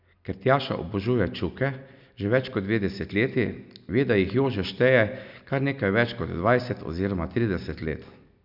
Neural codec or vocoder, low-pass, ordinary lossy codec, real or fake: none; 5.4 kHz; none; real